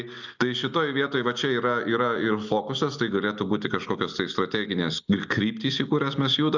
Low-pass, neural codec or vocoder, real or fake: 7.2 kHz; none; real